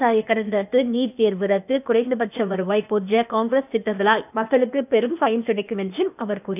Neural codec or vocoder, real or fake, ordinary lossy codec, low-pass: codec, 16 kHz, 0.8 kbps, ZipCodec; fake; none; 3.6 kHz